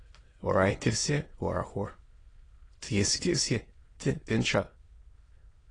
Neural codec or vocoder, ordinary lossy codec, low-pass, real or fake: autoencoder, 22.05 kHz, a latent of 192 numbers a frame, VITS, trained on many speakers; AAC, 32 kbps; 9.9 kHz; fake